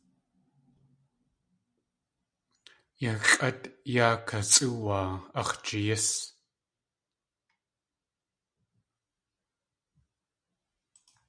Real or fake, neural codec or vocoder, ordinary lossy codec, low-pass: real; none; MP3, 96 kbps; 9.9 kHz